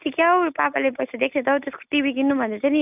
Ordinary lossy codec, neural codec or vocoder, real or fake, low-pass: none; none; real; 3.6 kHz